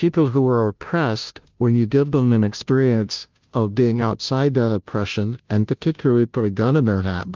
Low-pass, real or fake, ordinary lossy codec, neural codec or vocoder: 7.2 kHz; fake; Opus, 32 kbps; codec, 16 kHz, 0.5 kbps, FunCodec, trained on Chinese and English, 25 frames a second